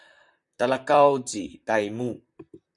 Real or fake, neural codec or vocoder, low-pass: fake; vocoder, 44.1 kHz, 128 mel bands, Pupu-Vocoder; 10.8 kHz